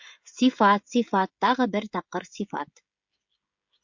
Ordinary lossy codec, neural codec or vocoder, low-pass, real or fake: MP3, 48 kbps; codec, 16 kHz, 16 kbps, FreqCodec, smaller model; 7.2 kHz; fake